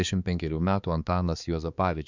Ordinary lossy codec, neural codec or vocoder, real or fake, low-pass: Opus, 64 kbps; codec, 16 kHz, 4 kbps, X-Codec, HuBERT features, trained on balanced general audio; fake; 7.2 kHz